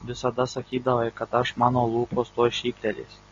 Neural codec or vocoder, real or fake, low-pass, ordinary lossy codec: none; real; 7.2 kHz; AAC, 32 kbps